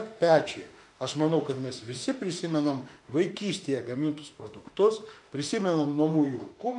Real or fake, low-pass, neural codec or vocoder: fake; 10.8 kHz; autoencoder, 48 kHz, 32 numbers a frame, DAC-VAE, trained on Japanese speech